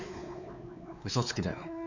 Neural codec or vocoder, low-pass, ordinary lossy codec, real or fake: codec, 16 kHz, 4 kbps, X-Codec, WavLM features, trained on Multilingual LibriSpeech; 7.2 kHz; none; fake